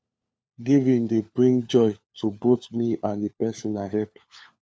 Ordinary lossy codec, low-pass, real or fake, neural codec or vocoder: none; none; fake; codec, 16 kHz, 4 kbps, FunCodec, trained on LibriTTS, 50 frames a second